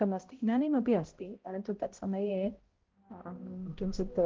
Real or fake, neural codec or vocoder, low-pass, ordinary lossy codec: fake; codec, 16 kHz, 0.5 kbps, X-Codec, HuBERT features, trained on balanced general audio; 7.2 kHz; Opus, 16 kbps